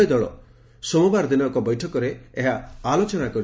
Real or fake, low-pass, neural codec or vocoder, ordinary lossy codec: real; none; none; none